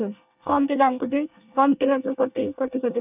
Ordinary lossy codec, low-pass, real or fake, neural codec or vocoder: none; 3.6 kHz; fake; codec, 24 kHz, 1 kbps, SNAC